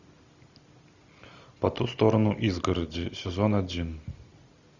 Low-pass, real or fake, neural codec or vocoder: 7.2 kHz; real; none